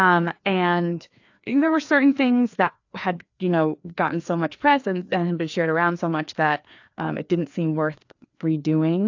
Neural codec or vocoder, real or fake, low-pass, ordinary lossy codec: codec, 16 kHz, 2 kbps, FreqCodec, larger model; fake; 7.2 kHz; AAC, 48 kbps